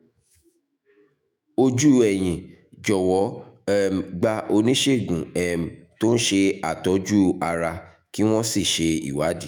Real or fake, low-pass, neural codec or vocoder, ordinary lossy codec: fake; none; autoencoder, 48 kHz, 128 numbers a frame, DAC-VAE, trained on Japanese speech; none